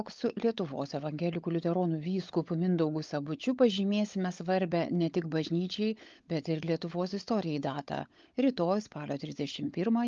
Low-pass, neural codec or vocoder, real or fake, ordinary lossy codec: 7.2 kHz; codec, 16 kHz, 16 kbps, FunCodec, trained on LibriTTS, 50 frames a second; fake; Opus, 24 kbps